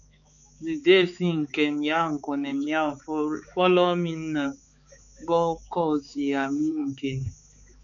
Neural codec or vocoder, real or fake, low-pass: codec, 16 kHz, 4 kbps, X-Codec, HuBERT features, trained on balanced general audio; fake; 7.2 kHz